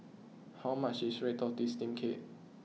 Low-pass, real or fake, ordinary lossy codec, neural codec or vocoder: none; real; none; none